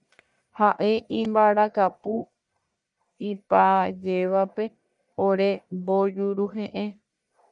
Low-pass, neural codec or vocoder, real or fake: 10.8 kHz; codec, 44.1 kHz, 1.7 kbps, Pupu-Codec; fake